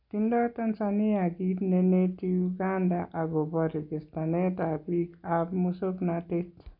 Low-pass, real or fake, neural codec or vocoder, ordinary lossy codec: 5.4 kHz; real; none; AAC, 48 kbps